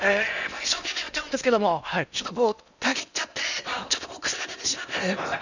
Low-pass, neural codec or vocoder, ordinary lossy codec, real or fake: 7.2 kHz; codec, 16 kHz in and 24 kHz out, 0.8 kbps, FocalCodec, streaming, 65536 codes; none; fake